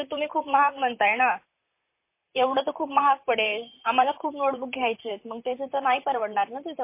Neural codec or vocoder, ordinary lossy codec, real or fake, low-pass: vocoder, 44.1 kHz, 128 mel bands, Pupu-Vocoder; MP3, 24 kbps; fake; 3.6 kHz